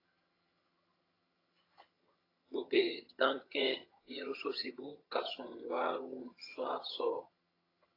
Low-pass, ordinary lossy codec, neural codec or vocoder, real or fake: 5.4 kHz; AAC, 24 kbps; vocoder, 22.05 kHz, 80 mel bands, HiFi-GAN; fake